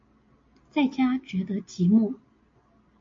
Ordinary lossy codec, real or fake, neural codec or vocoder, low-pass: AAC, 48 kbps; real; none; 7.2 kHz